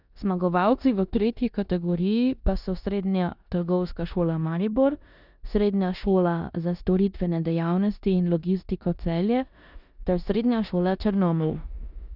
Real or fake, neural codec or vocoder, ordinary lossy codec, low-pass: fake; codec, 16 kHz in and 24 kHz out, 0.9 kbps, LongCat-Audio-Codec, four codebook decoder; none; 5.4 kHz